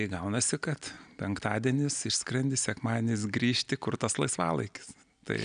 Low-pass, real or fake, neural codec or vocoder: 9.9 kHz; real; none